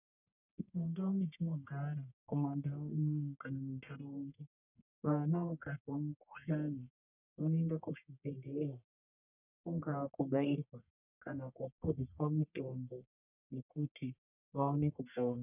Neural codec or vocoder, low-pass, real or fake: codec, 44.1 kHz, 1.7 kbps, Pupu-Codec; 3.6 kHz; fake